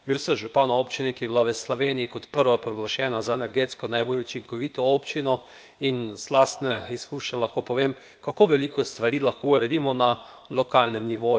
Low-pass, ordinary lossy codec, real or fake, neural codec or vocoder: none; none; fake; codec, 16 kHz, 0.8 kbps, ZipCodec